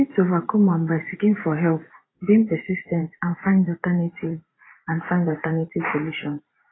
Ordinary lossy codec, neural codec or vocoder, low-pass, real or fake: AAC, 16 kbps; vocoder, 44.1 kHz, 128 mel bands every 512 samples, BigVGAN v2; 7.2 kHz; fake